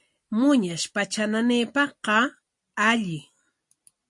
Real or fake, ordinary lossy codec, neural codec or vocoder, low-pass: real; MP3, 48 kbps; none; 10.8 kHz